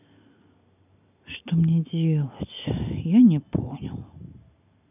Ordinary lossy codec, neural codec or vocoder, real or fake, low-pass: none; none; real; 3.6 kHz